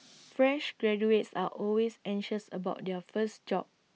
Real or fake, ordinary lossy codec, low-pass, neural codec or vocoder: real; none; none; none